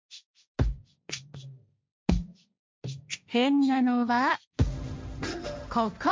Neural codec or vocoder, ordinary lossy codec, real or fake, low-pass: codec, 16 kHz, 1.1 kbps, Voila-Tokenizer; none; fake; none